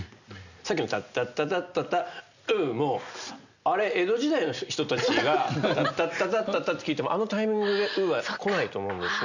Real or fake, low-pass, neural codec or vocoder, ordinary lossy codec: real; 7.2 kHz; none; none